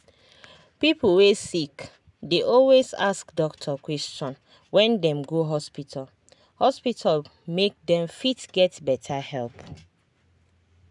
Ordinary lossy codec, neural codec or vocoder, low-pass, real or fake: none; none; 10.8 kHz; real